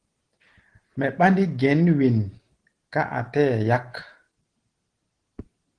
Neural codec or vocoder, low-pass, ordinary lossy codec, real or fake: none; 9.9 kHz; Opus, 16 kbps; real